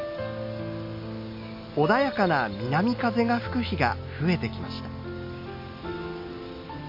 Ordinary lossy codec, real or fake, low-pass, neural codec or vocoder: MP3, 32 kbps; real; 5.4 kHz; none